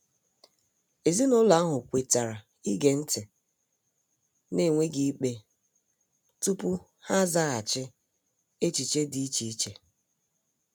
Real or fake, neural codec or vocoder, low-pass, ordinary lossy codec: real; none; none; none